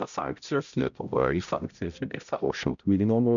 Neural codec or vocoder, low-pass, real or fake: codec, 16 kHz, 0.5 kbps, X-Codec, HuBERT features, trained on balanced general audio; 7.2 kHz; fake